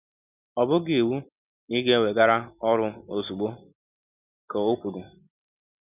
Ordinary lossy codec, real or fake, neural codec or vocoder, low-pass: none; real; none; 3.6 kHz